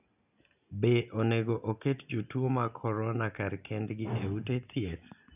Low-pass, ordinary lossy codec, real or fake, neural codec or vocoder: 3.6 kHz; none; real; none